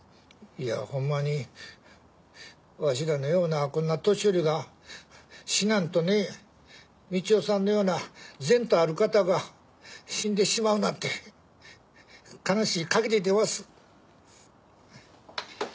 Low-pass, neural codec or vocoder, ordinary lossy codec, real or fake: none; none; none; real